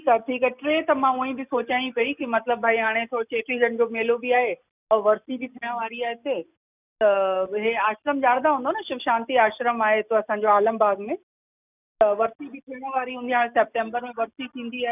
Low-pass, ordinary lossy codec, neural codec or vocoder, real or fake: 3.6 kHz; none; none; real